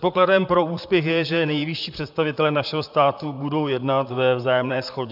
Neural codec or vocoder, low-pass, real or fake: vocoder, 44.1 kHz, 128 mel bands, Pupu-Vocoder; 5.4 kHz; fake